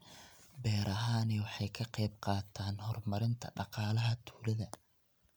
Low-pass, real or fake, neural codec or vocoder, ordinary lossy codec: none; real; none; none